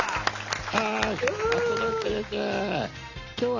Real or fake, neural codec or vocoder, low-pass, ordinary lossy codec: real; none; 7.2 kHz; none